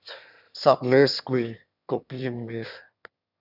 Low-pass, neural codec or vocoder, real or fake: 5.4 kHz; autoencoder, 22.05 kHz, a latent of 192 numbers a frame, VITS, trained on one speaker; fake